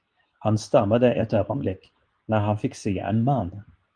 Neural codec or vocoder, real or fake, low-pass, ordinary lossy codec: codec, 24 kHz, 0.9 kbps, WavTokenizer, medium speech release version 2; fake; 9.9 kHz; Opus, 32 kbps